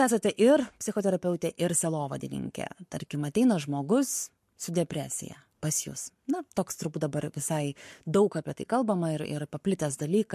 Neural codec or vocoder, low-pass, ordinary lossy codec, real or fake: codec, 44.1 kHz, 7.8 kbps, Pupu-Codec; 14.4 kHz; MP3, 64 kbps; fake